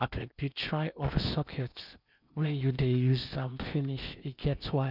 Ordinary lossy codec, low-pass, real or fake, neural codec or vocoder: none; 5.4 kHz; fake; codec, 16 kHz in and 24 kHz out, 0.8 kbps, FocalCodec, streaming, 65536 codes